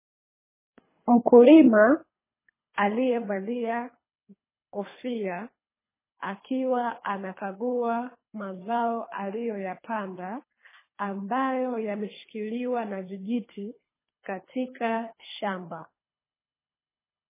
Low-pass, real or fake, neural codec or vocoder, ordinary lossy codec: 3.6 kHz; fake; codec, 24 kHz, 3 kbps, HILCodec; MP3, 16 kbps